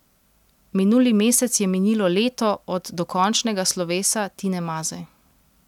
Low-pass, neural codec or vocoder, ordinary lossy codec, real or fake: 19.8 kHz; none; none; real